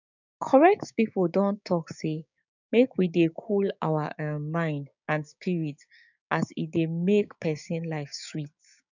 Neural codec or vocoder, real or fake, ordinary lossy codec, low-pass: codec, 16 kHz, 6 kbps, DAC; fake; none; 7.2 kHz